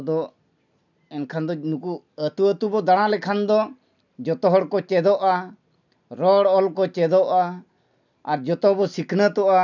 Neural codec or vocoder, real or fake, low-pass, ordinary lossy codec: none; real; 7.2 kHz; none